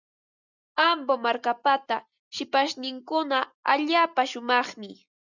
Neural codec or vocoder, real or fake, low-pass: none; real; 7.2 kHz